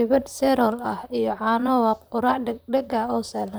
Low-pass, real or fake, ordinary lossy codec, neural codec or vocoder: none; fake; none; vocoder, 44.1 kHz, 128 mel bands, Pupu-Vocoder